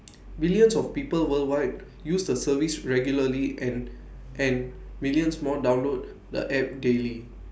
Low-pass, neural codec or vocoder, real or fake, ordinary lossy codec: none; none; real; none